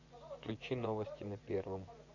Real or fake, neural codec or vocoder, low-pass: fake; vocoder, 24 kHz, 100 mel bands, Vocos; 7.2 kHz